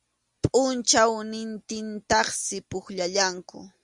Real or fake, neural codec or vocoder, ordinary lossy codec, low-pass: real; none; Opus, 64 kbps; 10.8 kHz